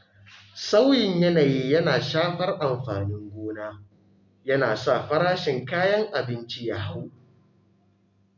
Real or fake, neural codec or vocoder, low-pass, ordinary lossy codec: real; none; 7.2 kHz; none